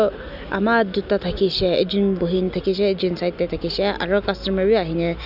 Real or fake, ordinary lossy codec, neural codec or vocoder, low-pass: real; none; none; 5.4 kHz